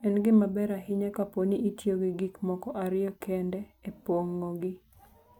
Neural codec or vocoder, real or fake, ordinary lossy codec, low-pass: none; real; none; 19.8 kHz